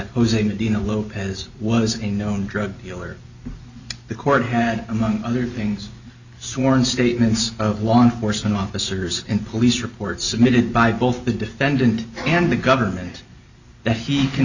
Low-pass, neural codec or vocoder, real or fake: 7.2 kHz; none; real